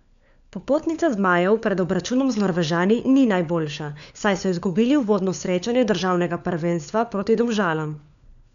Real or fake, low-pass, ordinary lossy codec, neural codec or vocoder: fake; 7.2 kHz; none; codec, 16 kHz, 4 kbps, FunCodec, trained on LibriTTS, 50 frames a second